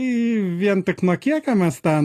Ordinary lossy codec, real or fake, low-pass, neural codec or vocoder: AAC, 48 kbps; real; 14.4 kHz; none